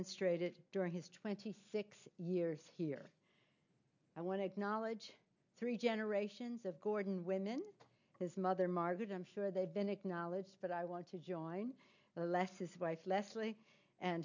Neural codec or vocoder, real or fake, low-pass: none; real; 7.2 kHz